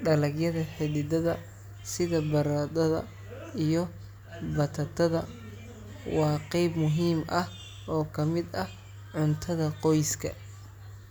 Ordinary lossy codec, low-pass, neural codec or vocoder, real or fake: none; none; none; real